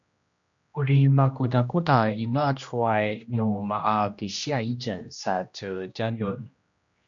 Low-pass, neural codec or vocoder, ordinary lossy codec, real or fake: 7.2 kHz; codec, 16 kHz, 1 kbps, X-Codec, HuBERT features, trained on general audio; MP3, 64 kbps; fake